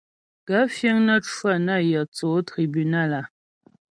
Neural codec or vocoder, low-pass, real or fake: none; 9.9 kHz; real